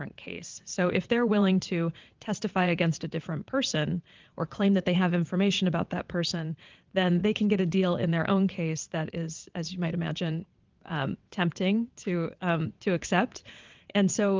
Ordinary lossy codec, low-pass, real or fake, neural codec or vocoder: Opus, 32 kbps; 7.2 kHz; fake; vocoder, 22.05 kHz, 80 mel bands, WaveNeXt